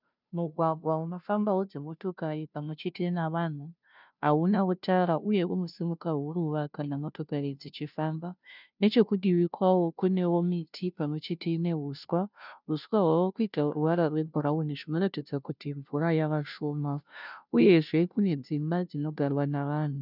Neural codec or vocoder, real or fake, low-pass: codec, 16 kHz, 0.5 kbps, FunCodec, trained on Chinese and English, 25 frames a second; fake; 5.4 kHz